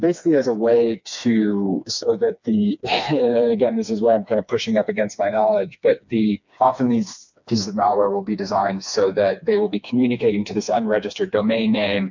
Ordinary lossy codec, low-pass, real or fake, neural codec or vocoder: MP3, 64 kbps; 7.2 kHz; fake; codec, 16 kHz, 2 kbps, FreqCodec, smaller model